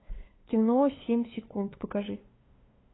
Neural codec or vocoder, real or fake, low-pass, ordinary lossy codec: codec, 16 kHz, 2 kbps, FunCodec, trained on LibriTTS, 25 frames a second; fake; 7.2 kHz; AAC, 16 kbps